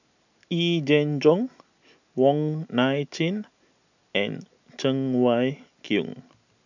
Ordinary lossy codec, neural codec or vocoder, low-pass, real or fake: none; none; 7.2 kHz; real